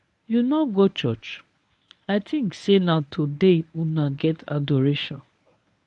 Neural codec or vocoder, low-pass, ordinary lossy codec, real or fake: codec, 24 kHz, 0.9 kbps, WavTokenizer, medium speech release version 1; 10.8 kHz; none; fake